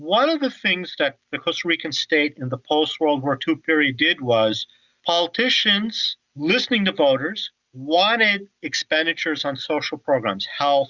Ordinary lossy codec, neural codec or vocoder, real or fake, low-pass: Opus, 64 kbps; none; real; 7.2 kHz